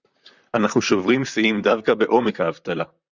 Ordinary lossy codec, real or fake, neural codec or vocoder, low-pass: Opus, 64 kbps; fake; vocoder, 44.1 kHz, 128 mel bands, Pupu-Vocoder; 7.2 kHz